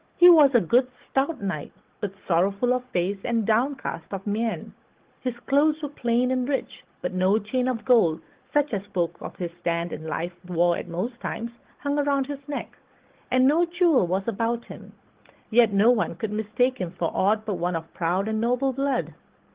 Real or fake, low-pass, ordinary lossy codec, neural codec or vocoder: fake; 3.6 kHz; Opus, 16 kbps; codec, 16 kHz, 16 kbps, FunCodec, trained on Chinese and English, 50 frames a second